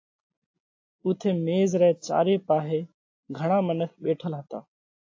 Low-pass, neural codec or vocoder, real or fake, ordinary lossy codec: 7.2 kHz; none; real; AAC, 48 kbps